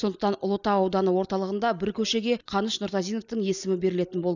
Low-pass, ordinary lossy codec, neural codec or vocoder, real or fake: 7.2 kHz; none; none; real